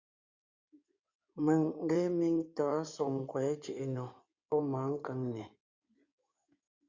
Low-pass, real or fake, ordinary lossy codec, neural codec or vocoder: 7.2 kHz; fake; Opus, 64 kbps; codec, 16 kHz in and 24 kHz out, 2.2 kbps, FireRedTTS-2 codec